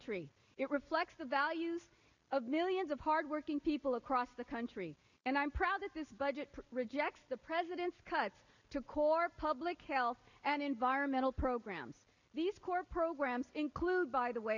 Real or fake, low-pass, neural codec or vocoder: real; 7.2 kHz; none